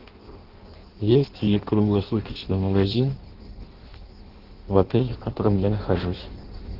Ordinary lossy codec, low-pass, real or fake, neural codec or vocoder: Opus, 16 kbps; 5.4 kHz; fake; codec, 16 kHz in and 24 kHz out, 0.6 kbps, FireRedTTS-2 codec